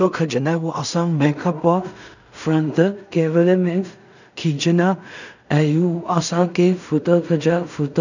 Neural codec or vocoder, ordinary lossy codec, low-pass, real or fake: codec, 16 kHz in and 24 kHz out, 0.4 kbps, LongCat-Audio-Codec, two codebook decoder; none; 7.2 kHz; fake